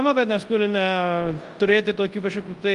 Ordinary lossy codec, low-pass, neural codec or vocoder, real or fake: Opus, 24 kbps; 10.8 kHz; codec, 24 kHz, 0.9 kbps, WavTokenizer, large speech release; fake